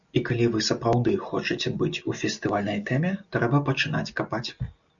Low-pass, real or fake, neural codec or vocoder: 7.2 kHz; real; none